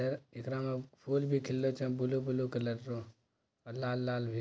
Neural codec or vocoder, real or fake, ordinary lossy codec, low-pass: none; real; none; none